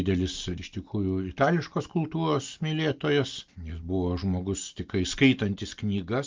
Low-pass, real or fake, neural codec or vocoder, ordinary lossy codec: 7.2 kHz; real; none; Opus, 24 kbps